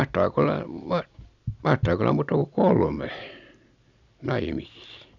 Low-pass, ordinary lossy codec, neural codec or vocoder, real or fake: 7.2 kHz; none; none; real